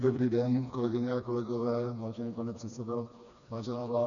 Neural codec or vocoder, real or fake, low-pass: codec, 16 kHz, 2 kbps, FreqCodec, smaller model; fake; 7.2 kHz